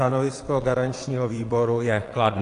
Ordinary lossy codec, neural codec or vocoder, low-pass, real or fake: AAC, 48 kbps; vocoder, 22.05 kHz, 80 mel bands, WaveNeXt; 9.9 kHz; fake